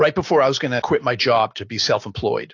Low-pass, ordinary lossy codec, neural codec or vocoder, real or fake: 7.2 kHz; AAC, 48 kbps; none; real